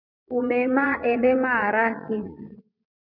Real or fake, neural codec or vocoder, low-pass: fake; vocoder, 22.05 kHz, 80 mel bands, Vocos; 5.4 kHz